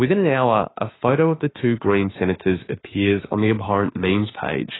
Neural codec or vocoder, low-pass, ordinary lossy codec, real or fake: autoencoder, 48 kHz, 32 numbers a frame, DAC-VAE, trained on Japanese speech; 7.2 kHz; AAC, 16 kbps; fake